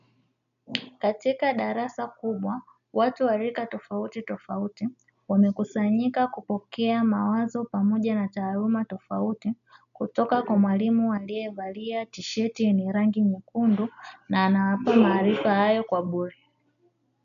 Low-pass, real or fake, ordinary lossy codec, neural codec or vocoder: 7.2 kHz; real; MP3, 96 kbps; none